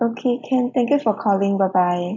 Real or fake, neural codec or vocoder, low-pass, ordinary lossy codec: real; none; 7.2 kHz; none